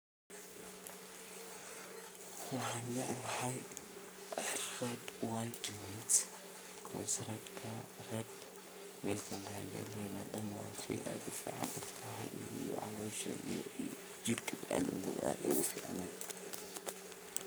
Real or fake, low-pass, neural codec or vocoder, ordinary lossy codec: fake; none; codec, 44.1 kHz, 3.4 kbps, Pupu-Codec; none